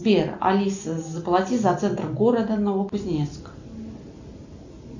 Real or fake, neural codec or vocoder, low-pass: real; none; 7.2 kHz